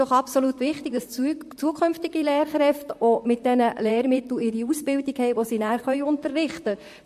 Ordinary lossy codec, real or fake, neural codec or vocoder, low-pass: MP3, 64 kbps; fake; vocoder, 44.1 kHz, 128 mel bands, Pupu-Vocoder; 14.4 kHz